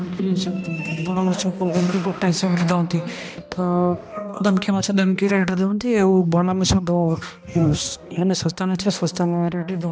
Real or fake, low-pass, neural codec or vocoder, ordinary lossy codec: fake; none; codec, 16 kHz, 1 kbps, X-Codec, HuBERT features, trained on balanced general audio; none